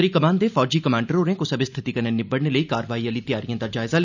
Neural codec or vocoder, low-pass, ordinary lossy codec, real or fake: none; 7.2 kHz; none; real